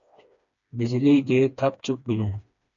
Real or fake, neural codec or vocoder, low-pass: fake; codec, 16 kHz, 2 kbps, FreqCodec, smaller model; 7.2 kHz